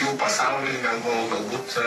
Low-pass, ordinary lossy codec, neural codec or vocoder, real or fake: 14.4 kHz; AAC, 48 kbps; codec, 44.1 kHz, 3.4 kbps, Pupu-Codec; fake